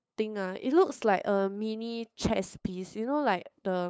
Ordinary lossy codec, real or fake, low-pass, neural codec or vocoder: none; fake; none; codec, 16 kHz, 8 kbps, FunCodec, trained on LibriTTS, 25 frames a second